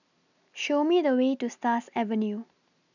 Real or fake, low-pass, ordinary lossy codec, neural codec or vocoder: real; 7.2 kHz; none; none